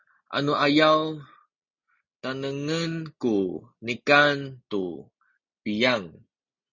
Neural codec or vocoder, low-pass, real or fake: none; 7.2 kHz; real